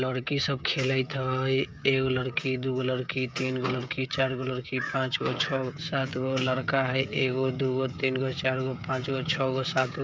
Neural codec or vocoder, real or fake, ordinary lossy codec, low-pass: codec, 16 kHz, 16 kbps, FreqCodec, smaller model; fake; none; none